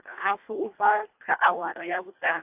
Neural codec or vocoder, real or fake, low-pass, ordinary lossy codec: codec, 24 kHz, 1.5 kbps, HILCodec; fake; 3.6 kHz; AAC, 32 kbps